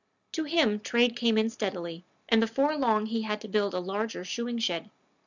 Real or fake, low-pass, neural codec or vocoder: real; 7.2 kHz; none